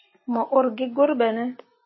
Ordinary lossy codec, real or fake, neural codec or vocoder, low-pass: MP3, 24 kbps; real; none; 7.2 kHz